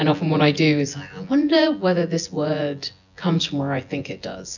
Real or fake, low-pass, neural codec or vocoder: fake; 7.2 kHz; vocoder, 24 kHz, 100 mel bands, Vocos